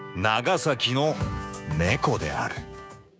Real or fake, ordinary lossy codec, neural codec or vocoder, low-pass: fake; none; codec, 16 kHz, 6 kbps, DAC; none